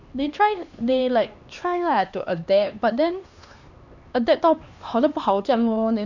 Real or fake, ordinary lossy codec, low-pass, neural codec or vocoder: fake; none; 7.2 kHz; codec, 16 kHz, 2 kbps, X-Codec, HuBERT features, trained on LibriSpeech